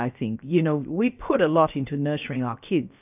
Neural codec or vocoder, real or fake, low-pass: codec, 16 kHz, about 1 kbps, DyCAST, with the encoder's durations; fake; 3.6 kHz